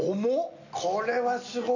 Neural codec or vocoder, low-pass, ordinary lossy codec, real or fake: none; 7.2 kHz; none; real